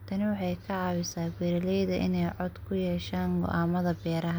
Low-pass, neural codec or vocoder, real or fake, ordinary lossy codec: none; none; real; none